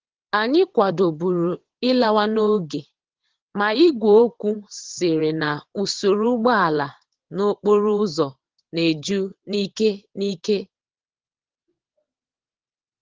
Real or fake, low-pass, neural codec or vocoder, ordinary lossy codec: fake; 7.2 kHz; vocoder, 22.05 kHz, 80 mel bands, WaveNeXt; Opus, 16 kbps